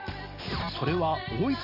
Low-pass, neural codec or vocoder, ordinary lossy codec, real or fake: 5.4 kHz; none; none; real